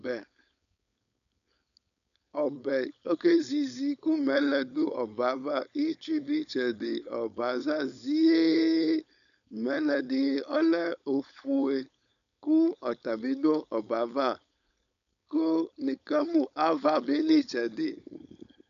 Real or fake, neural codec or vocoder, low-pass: fake; codec, 16 kHz, 4.8 kbps, FACodec; 7.2 kHz